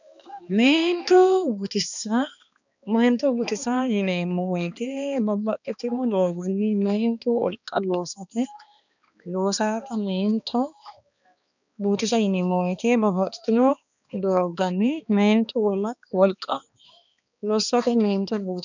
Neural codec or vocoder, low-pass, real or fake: codec, 16 kHz, 2 kbps, X-Codec, HuBERT features, trained on balanced general audio; 7.2 kHz; fake